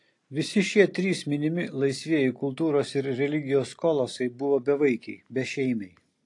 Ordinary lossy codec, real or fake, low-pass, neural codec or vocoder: AAC, 48 kbps; real; 10.8 kHz; none